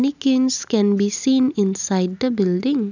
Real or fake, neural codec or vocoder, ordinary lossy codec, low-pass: real; none; none; 7.2 kHz